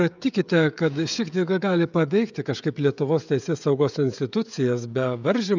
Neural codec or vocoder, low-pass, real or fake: codec, 16 kHz, 16 kbps, FreqCodec, smaller model; 7.2 kHz; fake